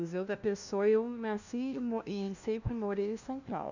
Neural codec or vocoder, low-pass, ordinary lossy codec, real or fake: codec, 16 kHz, 1 kbps, FunCodec, trained on LibriTTS, 50 frames a second; 7.2 kHz; none; fake